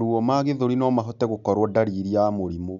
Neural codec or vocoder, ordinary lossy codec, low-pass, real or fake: none; none; 7.2 kHz; real